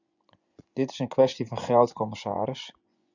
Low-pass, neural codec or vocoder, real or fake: 7.2 kHz; vocoder, 24 kHz, 100 mel bands, Vocos; fake